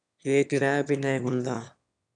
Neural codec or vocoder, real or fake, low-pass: autoencoder, 22.05 kHz, a latent of 192 numbers a frame, VITS, trained on one speaker; fake; 9.9 kHz